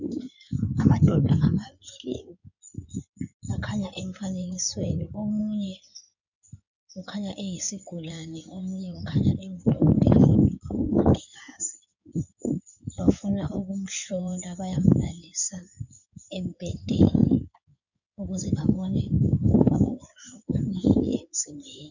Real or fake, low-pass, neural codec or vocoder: fake; 7.2 kHz; codec, 16 kHz in and 24 kHz out, 2.2 kbps, FireRedTTS-2 codec